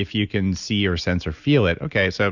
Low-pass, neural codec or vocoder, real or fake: 7.2 kHz; none; real